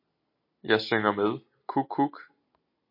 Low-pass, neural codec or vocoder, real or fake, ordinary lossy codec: 5.4 kHz; none; real; MP3, 32 kbps